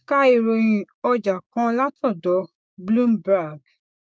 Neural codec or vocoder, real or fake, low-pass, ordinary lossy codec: codec, 16 kHz, 6 kbps, DAC; fake; none; none